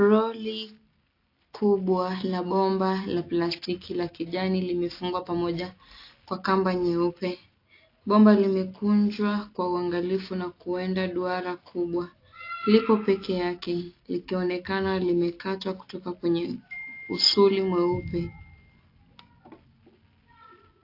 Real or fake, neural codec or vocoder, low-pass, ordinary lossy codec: real; none; 5.4 kHz; AAC, 32 kbps